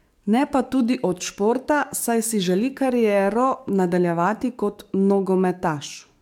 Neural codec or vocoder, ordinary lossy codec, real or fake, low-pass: codec, 44.1 kHz, 7.8 kbps, DAC; MP3, 96 kbps; fake; 19.8 kHz